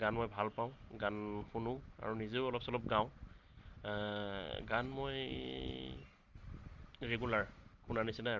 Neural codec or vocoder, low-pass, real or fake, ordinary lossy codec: none; 7.2 kHz; real; Opus, 32 kbps